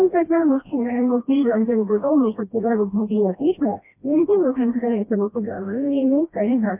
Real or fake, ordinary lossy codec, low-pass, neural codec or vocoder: fake; MP3, 32 kbps; 3.6 kHz; codec, 16 kHz, 1 kbps, FreqCodec, smaller model